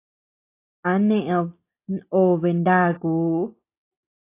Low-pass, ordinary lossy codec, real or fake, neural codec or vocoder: 3.6 kHz; AAC, 24 kbps; real; none